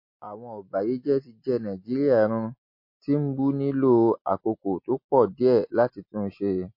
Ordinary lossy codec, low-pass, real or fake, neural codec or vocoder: MP3, 32 kbps; 5.4 kHz; real; none